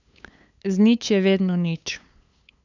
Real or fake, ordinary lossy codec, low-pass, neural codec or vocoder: fake; none; 7.2 kHz; codec, 16 kHz, 8 kbps, FunCodec, trained on LibriTTS, 25 frames a second